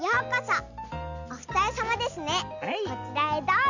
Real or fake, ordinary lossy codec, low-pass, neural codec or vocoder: real; none; 7.2 kHz; none